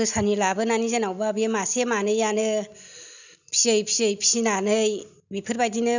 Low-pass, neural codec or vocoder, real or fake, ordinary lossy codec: 7.2 kHz; none; real; none